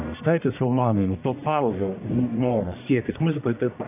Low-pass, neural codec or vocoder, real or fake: 3.6 kHz; codec, 44.1 kHz, 1.7 kbps, Pupu-Codec; fake